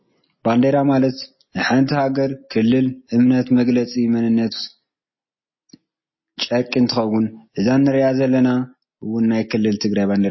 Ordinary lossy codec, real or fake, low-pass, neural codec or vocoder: MP3, 24 kbps; real; 7.2 kHz; none